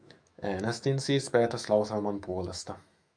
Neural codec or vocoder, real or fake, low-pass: autoencoder, 48 kHz, 128 numbers a frame, DAC-VAE, trained on Japanese speech; fake; 9.9 kHz